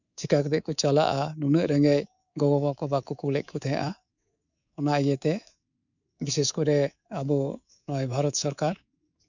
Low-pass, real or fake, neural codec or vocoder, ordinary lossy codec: 7.2 kHz; fake; codec, 24 kHz, 3.1 kbps, DualCodec; none